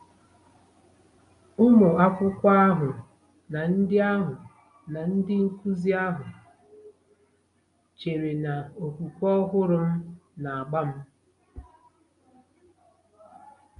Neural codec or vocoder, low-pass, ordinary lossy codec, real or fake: none; 10.8 kHz; MP3, 64 kbps; real